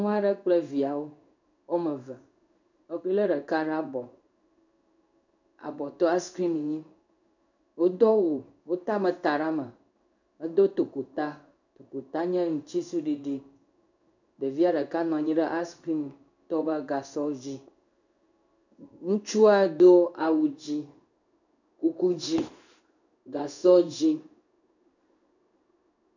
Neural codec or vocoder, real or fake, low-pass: codec, 16 kHz in and 24 kHz out, 1 kbps, XY-Tokenizer; fake; 7.2 kHz